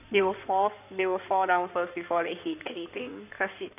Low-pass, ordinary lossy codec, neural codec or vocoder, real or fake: 3.6 kHz; none; codec, 16 kHz in and 24 kHz out, 2.2 kbps, FireRedTTS-2 codec; fake